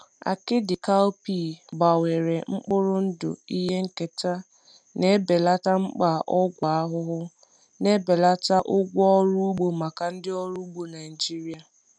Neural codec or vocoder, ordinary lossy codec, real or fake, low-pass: none; none; real; 9.9 kHz